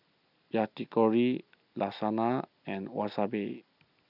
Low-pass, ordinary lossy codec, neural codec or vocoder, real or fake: 5.4 kHz; none; none; real